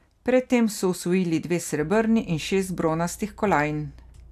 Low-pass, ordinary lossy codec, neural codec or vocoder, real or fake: 14.4 kHz; AAC, 96 kbps; none; real